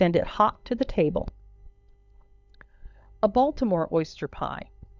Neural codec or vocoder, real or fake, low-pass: codec, 16 kHz, 16 kbps, FunCodec, trained on LibriTTS, 50 frames a second; fake; 7.2 kHz